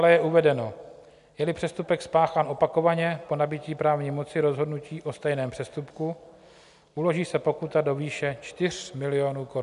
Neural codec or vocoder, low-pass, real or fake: none; 10.8 kHz; real